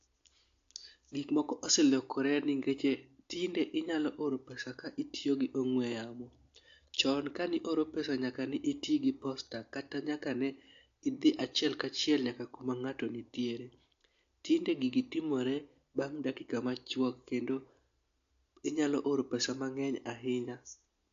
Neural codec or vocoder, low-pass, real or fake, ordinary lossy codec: none; 7.2 kHz; real; AAC, 48 kbps